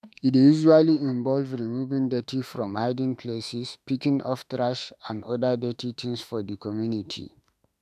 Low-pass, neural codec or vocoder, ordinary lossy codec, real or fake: 14.4 kHz; autoencoder, 48 kHz, 32 numbers a frame, DAC-VAE, trained on Japanese speech; none; fake